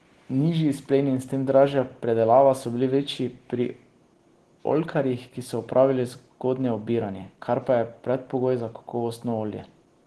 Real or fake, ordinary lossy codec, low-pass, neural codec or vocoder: real; Opus, 16 kbps; 10.8 kHz; none